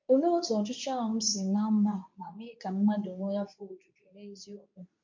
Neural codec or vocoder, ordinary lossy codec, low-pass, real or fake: codec, 24 kHz, 0.9 kbps, WavTokenizer, medium speech release version 2; MP3, 48 kbps; 7.2 kHz; fake